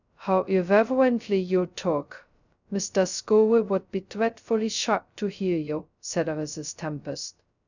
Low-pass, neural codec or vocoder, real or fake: 7.2 kHz; codec, 16 kHz, 0.2 kbps, FocalCodec; fake